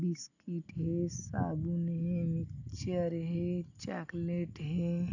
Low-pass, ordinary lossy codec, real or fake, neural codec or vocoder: 7.2 kHz; none; real; none